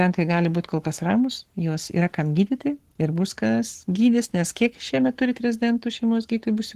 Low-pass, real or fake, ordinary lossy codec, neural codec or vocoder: 14.4 kHz; fake; Opus, 16 kbps; codec, 44.1 kHz, 7.8 kbps, Pupu-Codec